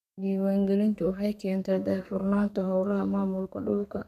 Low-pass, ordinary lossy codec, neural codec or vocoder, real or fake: 14.4 kHz; none; codec, 32 kHz, 1.9 kbps, SNAC; fake